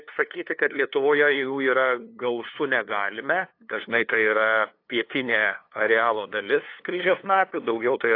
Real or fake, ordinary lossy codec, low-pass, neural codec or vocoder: fake; AAC, 32 kbps; 5.4 kHz; codec, 16 kHz, 2 kbps, FunCodec, trained on LibriTTS, 25 frames a second